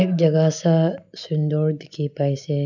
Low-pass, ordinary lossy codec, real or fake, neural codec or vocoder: 7.2 kHz; none; real; none